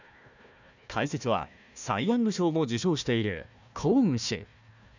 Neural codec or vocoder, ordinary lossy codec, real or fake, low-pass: codec, 16 kHz, 1 kbps, FunCodec, trained on Chinese and English, 50 frames a second; none; fake; 7.2 kHz